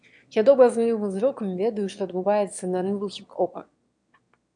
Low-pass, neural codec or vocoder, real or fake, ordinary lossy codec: 9.9 kHz; autoencoder, 22.05 kHz, a latent of 192 numbers a frame, VITS, trained on one speaker; fake; MP3, 64 kbps